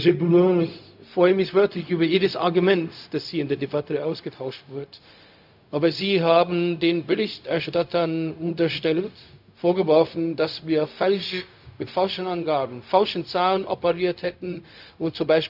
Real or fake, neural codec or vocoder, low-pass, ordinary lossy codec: fake; codec, 16 kHz, 0.4 kbps, LongCat-Audio-Codec; 5.4 kHz; AAC, 48 kbps